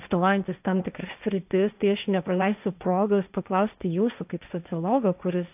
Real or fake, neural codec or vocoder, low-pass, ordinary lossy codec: fake; codec, 16 kHz, 1.1 kbps, Voila-Tokenizer; 3.6 kHz; AAC, 32 kbps